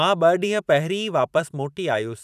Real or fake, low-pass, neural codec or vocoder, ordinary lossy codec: fake; 14.4 kHz; vocoder, 44.1 kHz, 128 mel bands every 512 samples, BigVGAN v2; none